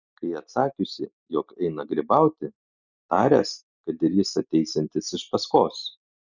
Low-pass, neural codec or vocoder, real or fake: 7.2 kHz; none; real